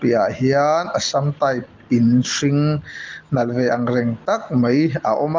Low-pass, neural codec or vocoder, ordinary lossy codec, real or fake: 7.2 kHz; none; Opus, 24 kbps; real